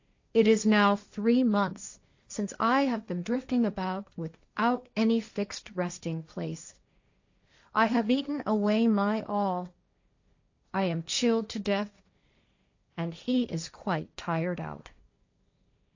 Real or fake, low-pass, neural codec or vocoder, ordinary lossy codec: fake; 7.2 kHz; codec, 16 kHz, 1.1 kbps, Voila-Tokenizer; AAC, 48 kbps